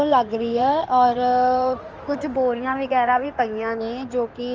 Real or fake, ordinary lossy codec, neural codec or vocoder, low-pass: fake; Opus, 24 kbps; codec, 16 kHz in and 24 kHz out, 2.2 kbps, FireRedTTS-2 codec; 7.2 kHz